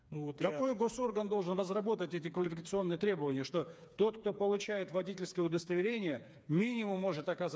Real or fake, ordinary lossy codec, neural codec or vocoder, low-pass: fake; none; codec, 16 kHz, 4 kbps, FreqCodec, smaller model; none